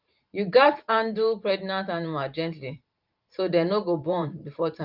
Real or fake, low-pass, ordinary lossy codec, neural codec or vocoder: fake; 5.4 kHz; Opus, 32 kbps; vocoder, 44.1 kHz, 128 mel bands every 512 samples, BigVGAN v2